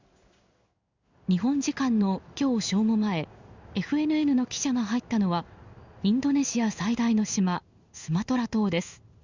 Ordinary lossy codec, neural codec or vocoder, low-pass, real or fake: Opus, 64 kbps; codec, 16 kHz in and 24 kHz out, 1 kbps, XY-Tokenizer; 7.2 kHz; fake